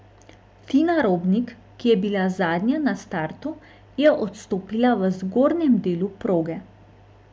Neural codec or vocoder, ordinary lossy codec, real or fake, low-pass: none; none; real; none